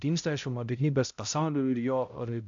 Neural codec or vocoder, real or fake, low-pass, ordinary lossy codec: codec, 16 kHz, 0.5 kbps, X-Codec, HuBERT features, trained on balanced general audio; fake; 7.2 kHz; AAC, 64 kbps